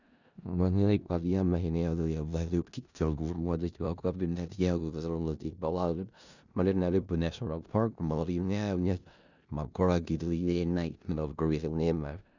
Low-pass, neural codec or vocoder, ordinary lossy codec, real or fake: 7.2 kHz; codec, 16 kHz in and 24 kHz out, 0.4 kbps, LongCat-Audio-Codec, four codebook decoder; none; fake